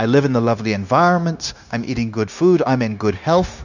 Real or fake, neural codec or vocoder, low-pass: fake; codec, 16 kHz, 0.9 kbps, LongCat-Audio-Codec; 7.2 kHz